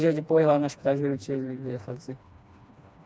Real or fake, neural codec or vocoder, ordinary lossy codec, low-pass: fake; codec, 16 kHz, 2 kbps, FreqCodec, smaller model; none; none